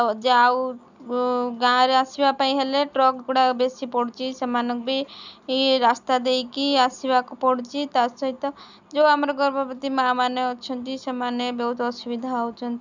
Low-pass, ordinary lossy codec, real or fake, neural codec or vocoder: 7.2 kHz; none; real; none